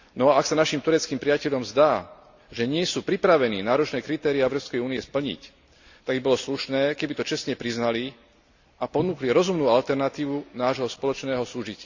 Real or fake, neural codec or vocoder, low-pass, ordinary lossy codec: real; none; 7.2 kHz; Opus, 64 kbps